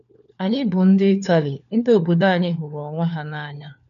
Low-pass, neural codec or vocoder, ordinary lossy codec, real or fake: 7.2 kHz; codec, 16 kHz, 4 kbps, FunCodec, trained on LibriTTS, 50 frames a second; none; fake